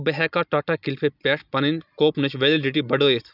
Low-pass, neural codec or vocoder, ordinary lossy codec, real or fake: 5.4 kHz; none; none; real